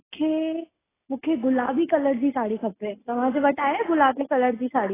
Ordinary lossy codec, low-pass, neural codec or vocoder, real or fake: AAC, 16 kbps; 3.6 kHz; none; real